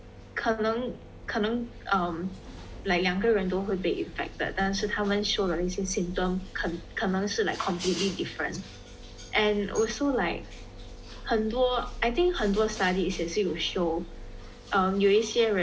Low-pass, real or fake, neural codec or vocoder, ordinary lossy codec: none; real; none; none